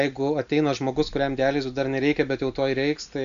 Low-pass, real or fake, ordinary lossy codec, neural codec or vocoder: 7.2 kHz; real; MP3, 64 kbps; none